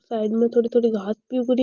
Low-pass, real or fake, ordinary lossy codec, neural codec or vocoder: 7.2 kHz; real; Opus, 32 kbps; none